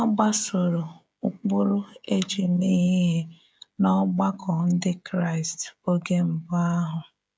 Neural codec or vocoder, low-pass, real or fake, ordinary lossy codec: codec, 16 kHz, 16 kbps, FreqCodec, smaller model; none; fake; none